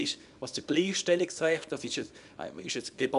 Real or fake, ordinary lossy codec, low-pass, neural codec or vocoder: fake; none; 10.8 kHz; codec, 24 kHz, 0.9 kbps, WavTokenizer, small release